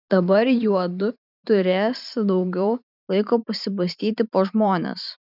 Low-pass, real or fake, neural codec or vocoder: 5.4 kHz; fake; vocoder, 44.1 kHz, 128 mel bands every 512 samples, BigVGAN v2